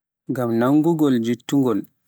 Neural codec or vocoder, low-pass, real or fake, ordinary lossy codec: none; none; real; none